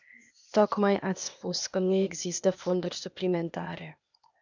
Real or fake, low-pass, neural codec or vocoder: fake; 7.2 kHz; codec, 16 kHz, 0.8 kbps, ZipCodec